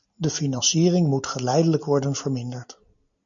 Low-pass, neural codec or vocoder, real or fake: 7.2 kHz; none; real